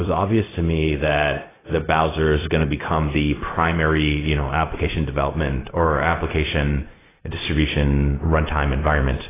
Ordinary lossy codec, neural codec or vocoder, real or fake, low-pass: AAC, 16 kbps; codec, 16 kHz, 0.4 kbps, LongCat-Audio-Codec; fake; 3.6 kHz